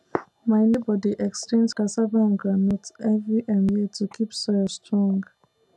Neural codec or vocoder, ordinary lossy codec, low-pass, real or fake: none; none; none; real